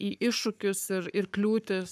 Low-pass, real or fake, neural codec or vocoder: 14.4 kHz; fake; codec, 44.1 kHz, 7.8 kbps, Pupu-Codec